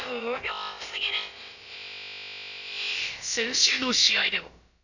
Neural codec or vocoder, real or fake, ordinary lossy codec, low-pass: codec, 16 kHz, about 1 kbps, DyCAST, with the encoder's durations; fake; none; 7.2 kHz